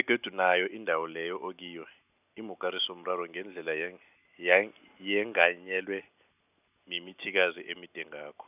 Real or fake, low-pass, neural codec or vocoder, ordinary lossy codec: real; 3.6 kHz; none; none